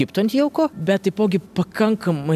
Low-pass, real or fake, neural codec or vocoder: 14.4 kHz; real; none